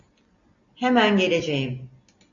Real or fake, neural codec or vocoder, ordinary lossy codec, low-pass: real; none; AAC, 64 kbps; 7.2 kHz